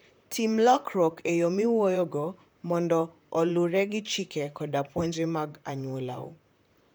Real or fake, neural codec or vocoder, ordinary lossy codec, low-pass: fake; vocoder, 44.1 kHz, 128 mel bands, Pupu-Vocoder; none; none